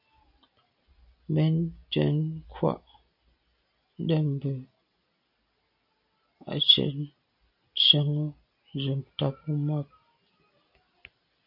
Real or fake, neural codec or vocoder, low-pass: real; none; 5.4 kHz